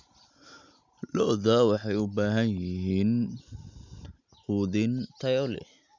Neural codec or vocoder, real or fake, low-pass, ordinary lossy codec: none; real; 7.2 kHz; none